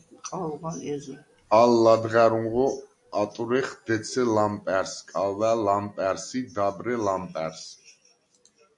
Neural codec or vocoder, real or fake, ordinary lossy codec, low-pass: none; real; AAC, 64 kbps; 10.8 kHz